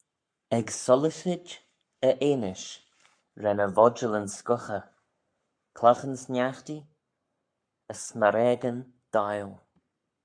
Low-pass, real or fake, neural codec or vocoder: 9.9 kHz; fake; codec, 44.1 kHz, 7.8 kbps, Pupu-Codec